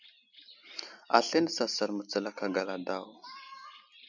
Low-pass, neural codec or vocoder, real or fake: 7.2 kHz; none; real